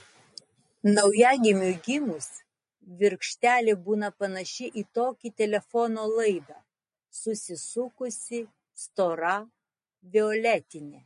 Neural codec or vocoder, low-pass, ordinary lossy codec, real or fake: none; 10.8 kHz; MP3, 48 kbps; real